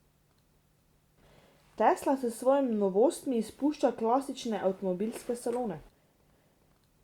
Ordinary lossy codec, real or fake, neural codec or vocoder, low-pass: Opus, 64 kbps; real; none; 19.8 kHz